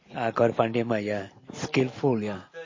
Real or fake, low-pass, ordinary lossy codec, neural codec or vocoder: real; 7.2 kHz; MP3, 32 kbps; none